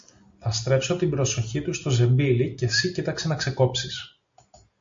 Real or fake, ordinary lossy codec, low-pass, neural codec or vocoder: real; MP3, 48 kbps; 7.2 kHz; none